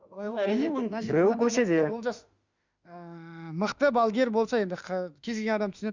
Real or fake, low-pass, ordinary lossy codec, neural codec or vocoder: fake; 7.2 kHz; Opus, 64 kbps; autoencoder, 48 kHz, 32 numbers a frame, DAC-VAE, trained on Japanese speech